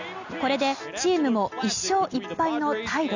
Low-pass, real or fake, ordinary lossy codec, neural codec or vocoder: 7.2 kHz; real; none; none